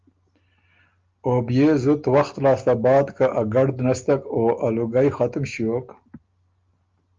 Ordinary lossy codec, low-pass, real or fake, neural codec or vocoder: Opus, 24 kbps; 7.2 kHz; real; none